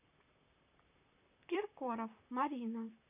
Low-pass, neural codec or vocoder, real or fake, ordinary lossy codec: 3.6 kHz; vocoder, 44.1 kHz, 128 mel bands, Pupu-Vocoder; fake; none